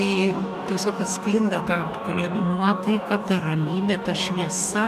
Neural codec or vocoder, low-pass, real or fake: codec, 44.1 kHz, 2.6 kbps, DAC; 14.4 kHz; fake